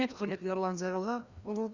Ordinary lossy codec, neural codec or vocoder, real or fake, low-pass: Opus, 64 kbps; codec, 16 kHz, 1 kbps, FreqCodec, larger model; fake; 7.2 kHz